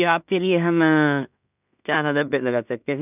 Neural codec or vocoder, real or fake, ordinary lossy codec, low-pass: codec, 16 kHz in and 24 kHz out, 0.4 kbps, LongCat-Audio-Codec, two codebook decoder; fake; none; 3.6 kHz